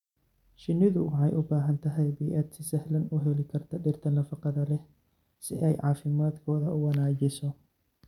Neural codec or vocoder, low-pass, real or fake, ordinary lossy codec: none; 19.8 kHz; real; none